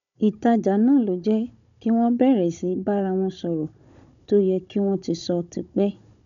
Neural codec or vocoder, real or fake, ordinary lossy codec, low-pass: codec, 16 kHz, 16 kbps, FunCodec, trained on Chinese and English, 50 frames a second; fake; none; 7.2 kHz